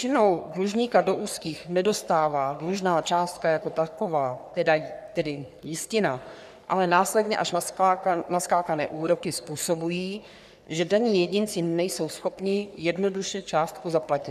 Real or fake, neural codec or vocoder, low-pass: fake; codec, 44.1 kHz, 3.4 kbps, Pupu-Codec; 14.4 kHz